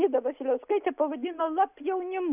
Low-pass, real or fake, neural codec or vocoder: 3.6 kHz; real; none